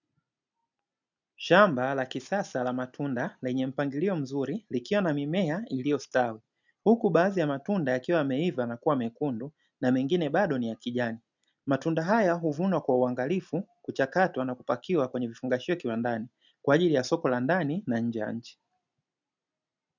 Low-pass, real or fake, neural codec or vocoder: 7.2 kHz; real; none